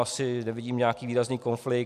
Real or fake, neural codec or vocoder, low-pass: real; none; 14.4 kHz